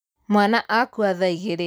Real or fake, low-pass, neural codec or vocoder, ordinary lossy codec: real; none; none; none